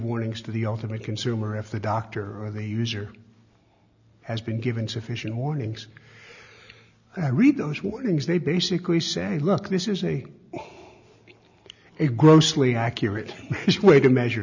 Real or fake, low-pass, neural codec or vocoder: real; 7.2 kHz; none